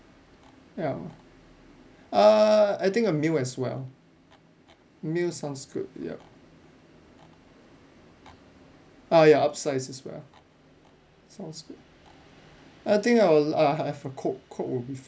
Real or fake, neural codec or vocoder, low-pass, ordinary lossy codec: real; none; none; none